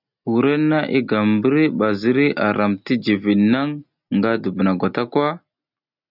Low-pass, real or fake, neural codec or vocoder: 5.4 kHz; real; none